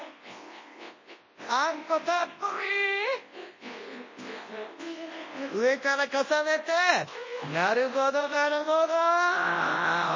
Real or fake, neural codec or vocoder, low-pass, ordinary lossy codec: fake; codec, 24 kHz, 0.9 kbps, WavTokenizer, large speech release; 7.2 kHz; MP3, 32 kbps